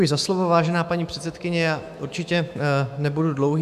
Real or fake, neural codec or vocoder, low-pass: fake; autoencoder, 48 kHz, 128 numbers a frame, DAC-VAE, trained on Japanese speech; 14.4 kHz